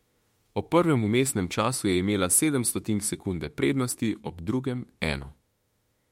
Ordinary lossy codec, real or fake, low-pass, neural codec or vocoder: MP3, 64 kbps; fake; 19.8 kHz; autoencoder, 48 kHz, 32 numbers a frame, DAC-VAE, trained on Japanese speech